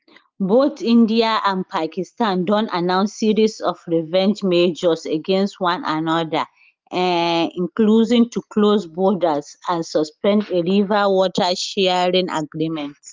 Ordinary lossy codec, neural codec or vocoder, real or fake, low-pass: Opus, 32 kbps; none; real; 7.2 kHz